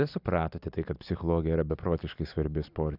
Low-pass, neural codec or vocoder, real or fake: 5.4 kHz; none; real